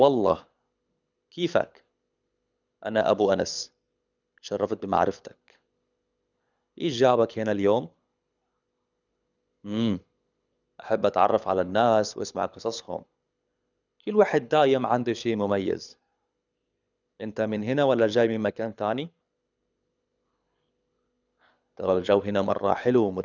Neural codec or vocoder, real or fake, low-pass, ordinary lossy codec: codec, 24 kHz, 6 kbps, HILCodec; fake; 7.2 kHz; none